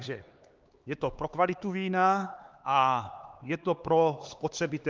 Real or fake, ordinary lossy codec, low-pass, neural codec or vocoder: fake; Opus, 32 kbps; 7.2 kHz; codec, 16 kHz, 4 kbps, X-Codec, HuBERT features, trained on LibriSpeech